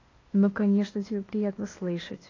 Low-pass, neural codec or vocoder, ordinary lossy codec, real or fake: 7.2 kHz; codec, 16 kHz, 0.8 kbps, ZipCodec; AAC, 32 kbps; fake